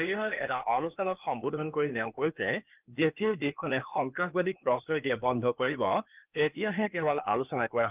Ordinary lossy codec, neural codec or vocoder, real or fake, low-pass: Opus, 24 kbps; codec, 16 kHz, 0.8 kbps, ZipCodec; fake; 3.6 kHz